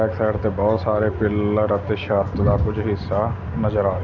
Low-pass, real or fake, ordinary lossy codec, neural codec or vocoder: 7.2 kHz; real; none; none